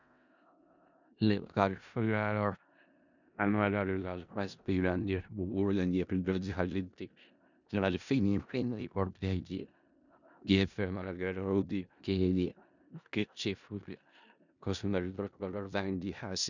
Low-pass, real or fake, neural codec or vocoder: 7.2 kHz; fake; codec, 16 kHz in and 24 kHz out, 0.4 kbps, LongCat-Audio-Codec, four codebook decoder